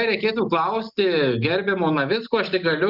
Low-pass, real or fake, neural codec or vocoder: 5.4 kHz; real; none